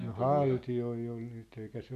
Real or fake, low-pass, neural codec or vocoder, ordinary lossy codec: real; 14.4 kHz; none; MP3, 96 kbps